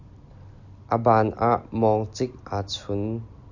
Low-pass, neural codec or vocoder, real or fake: 7.2 kHz; none; real